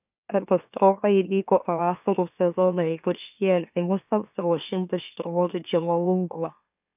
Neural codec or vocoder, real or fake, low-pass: autoencoder, 44.1 kHz, a latent of 192 numbers a frame, MeloTTS; fake; 3.6 kHz